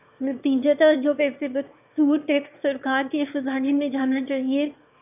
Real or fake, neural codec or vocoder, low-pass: fake; autoencoder, 22.05 kHz, a latent of 192 numbers a frame, VITS, trained on one speaker; 3.6 kHz